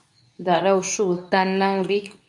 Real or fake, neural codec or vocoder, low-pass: fake; codec, 24 kHz, 0.9 kbps, WavTokenizer, medium speech release version 2; 10.8 kHz